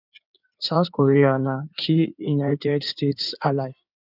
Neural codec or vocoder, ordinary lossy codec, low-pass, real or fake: codec, 16 kHz in and 24 kHz out, 2.2 kbps, FireRedTTS-2 codec; none; 5.4 kHz; fake